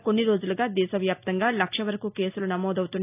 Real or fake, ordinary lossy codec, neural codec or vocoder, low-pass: real; AAC, 32 kbps; none; 3.6 kHz